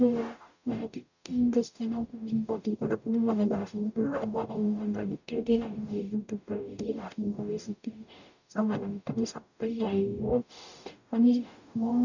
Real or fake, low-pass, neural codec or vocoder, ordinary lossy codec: fake; 7.2 kHz; codec, 44.1 kHz, 0.9 kbps, DAC; none